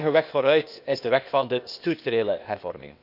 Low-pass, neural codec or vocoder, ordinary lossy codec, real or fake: 5.4 kHz; codec, 16 kHz, 0.8 kbps, ZipCodec; none; fake